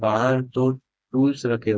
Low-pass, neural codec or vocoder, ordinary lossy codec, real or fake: none; codec, 16 kHz, 2 kbps, FreqCodec, smaller model; none; fake